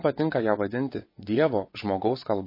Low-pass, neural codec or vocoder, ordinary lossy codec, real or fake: 5.4 kHz; vocoder, 22.05 kHz, 80 mel bands, WaveNeXt; MP3, 24 kbps; fake